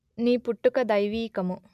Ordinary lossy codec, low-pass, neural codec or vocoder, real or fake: none; 14.4 kHz; none; real